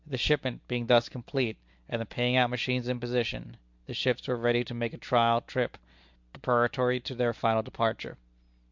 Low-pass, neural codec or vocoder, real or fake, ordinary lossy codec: 7.2 kHz; none; real; MP3, 64 kbps